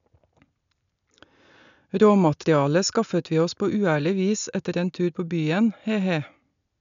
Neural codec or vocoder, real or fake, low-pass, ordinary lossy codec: none; real; 7.2 kHz; none